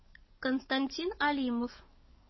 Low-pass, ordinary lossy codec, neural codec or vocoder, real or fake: 7.2 kHz; MP3, 24 kbps; codec, 44.1 kHz, 7.8 kbps, DAC; fake